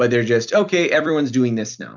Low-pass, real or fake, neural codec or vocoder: 7.2 kHz; fake; vocoder, 44.1 kHz, 128 mel bands every 256 samples, BigVGAN v2